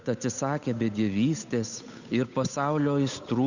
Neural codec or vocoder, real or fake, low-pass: codec, 16 kHz, 8 kbps, FunCodec, trained on Chinese and English, 25 frames a second; fake; 7.2 kHz